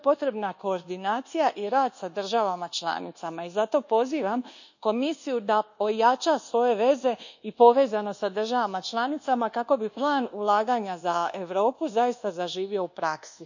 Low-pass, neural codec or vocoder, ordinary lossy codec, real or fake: 7.2 kHz; codec, 24 kHz, 1.2 kbps, DualCodec; none; fake